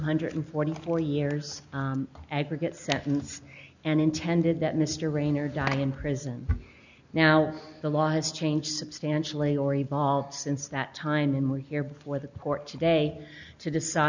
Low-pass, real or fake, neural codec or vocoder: 7.2 kHz; real; none